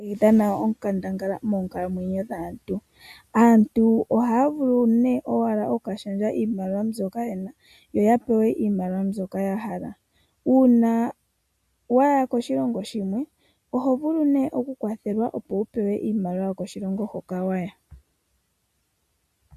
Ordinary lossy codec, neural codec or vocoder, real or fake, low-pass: AAC, 96 kbps; none; real; 14.4 kHz